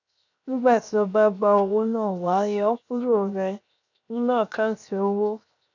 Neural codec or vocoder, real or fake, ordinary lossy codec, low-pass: codec, 16 kHz, 0.7 kbps, FocalCodec; fake; none; 7.2 kHz